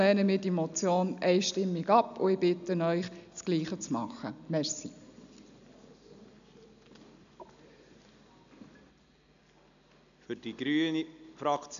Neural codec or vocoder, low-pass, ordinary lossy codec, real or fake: none; 7.2 kHz; none; real